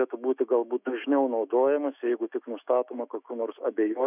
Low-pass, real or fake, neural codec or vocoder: 3.6 kHz; fake; autoencoder, 48 kHz, 128 numbers a frame, DAC-VAE, trained on Japanese speech